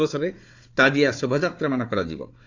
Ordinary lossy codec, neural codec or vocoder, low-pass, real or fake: AAC, 48 kbps; codec, 16 kHz, 4 kbps, FunCodec, trained on Chinese and English, 50 frames a second; 7.2 kHz; fake